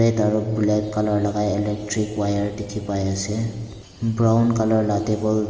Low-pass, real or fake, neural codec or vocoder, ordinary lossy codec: 7.2 kHz; real; none; Opus, 24 kbps